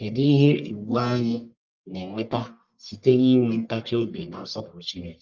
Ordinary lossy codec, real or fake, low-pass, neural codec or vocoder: Opus, 24 kbps; fake; 7.2 kHz; codec, 44.1 kHz, 1.7 kbps, Pupu-Codec